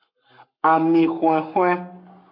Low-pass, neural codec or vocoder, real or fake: 5.4 kHz; codec, 44.1 kHz, 7.8 kbps, Pupu-Codec; fake